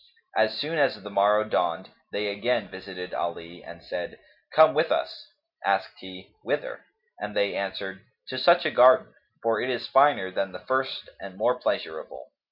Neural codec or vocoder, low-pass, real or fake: none; 5.4 kHz; real